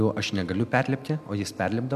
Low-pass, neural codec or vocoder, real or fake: 14.4 kHz; none; real